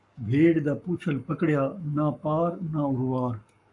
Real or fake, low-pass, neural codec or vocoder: fake; 10.8 kHz; codec, 44.1 kHz, 7.8 kbps, Pupu-Codec